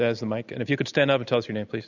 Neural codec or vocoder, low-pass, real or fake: none; 7.2 kHz; real